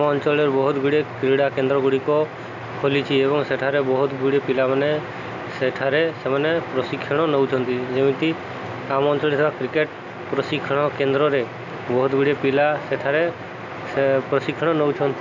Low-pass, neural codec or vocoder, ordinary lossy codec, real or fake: 7.2 kHz; none; none; real